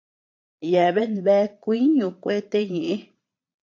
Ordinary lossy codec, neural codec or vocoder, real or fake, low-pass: AAC, 48 kbps; vocoder, 22.05 kHz, 80 mel bands, Vocos; fake; 7.2 kHz